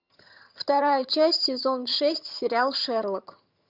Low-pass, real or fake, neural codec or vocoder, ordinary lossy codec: 5.4 kHz; fake; vocoder, 22.05 kHz, 80 mel bands, HiFi-GAN; Opus, 64 kbps